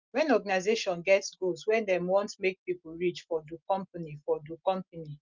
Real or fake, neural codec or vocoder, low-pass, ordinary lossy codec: real; none; 7.2 kHz; Opus, 24 kbps